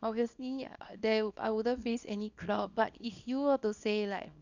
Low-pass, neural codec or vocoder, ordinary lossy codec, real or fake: 7.2 kHz; codec, 24 kHz, 0.9 kbps, WavTokenizer, small release; none; fake